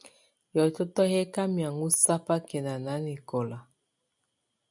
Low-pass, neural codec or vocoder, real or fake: 10.8 kHz; none; real